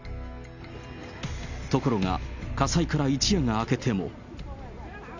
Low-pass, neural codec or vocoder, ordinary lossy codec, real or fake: 7.2 kHz; none; none; real